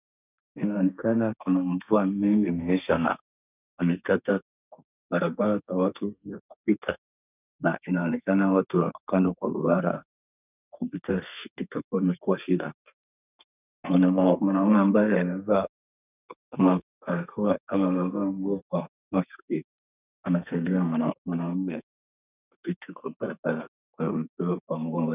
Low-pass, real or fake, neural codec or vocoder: 3.6 kHz; fake; codec, 32 kHz, 1.9 kbps, SNAC